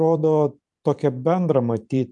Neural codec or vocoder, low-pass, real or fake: vocoder, 44.1 kHz, 128 mel bands every 512 samples, BigVGAN v2; 10.8 kHz; fake